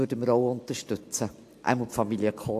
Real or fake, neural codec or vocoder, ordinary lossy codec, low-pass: real; none; AAC, 64 kbps; 14.4 kHz